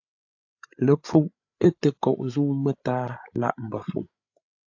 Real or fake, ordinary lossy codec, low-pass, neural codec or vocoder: fake; AAC, 48 kbps; 7.2 kHz; codec, 16 kHz, 8 kbps, FreqCodec, larger model